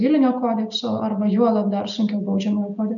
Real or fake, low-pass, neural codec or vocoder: real; 7.2 kHz; none